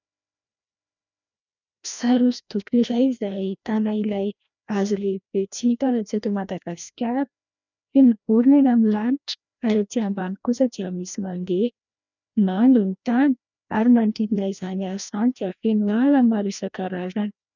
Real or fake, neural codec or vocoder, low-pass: fake; codec, 16 kHz, 1 kbps, FreqCodec, larger model; 7.2 kHz